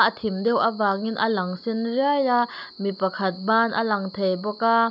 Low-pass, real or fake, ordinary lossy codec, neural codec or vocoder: 5.4 kHz; real; none; none